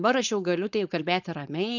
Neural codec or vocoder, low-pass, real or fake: codec, 16 kHz, 4.8 kbps, FACodec; 7.2 kHz; fake